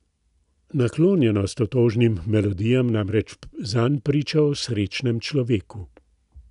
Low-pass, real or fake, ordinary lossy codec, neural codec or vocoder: 10.8 kHz; real; none; none